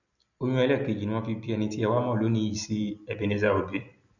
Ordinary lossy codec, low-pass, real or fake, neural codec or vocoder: none; 7.2 kHz; real; none